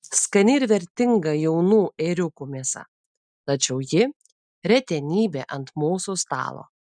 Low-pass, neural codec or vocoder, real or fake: 9.9 kHz; none; real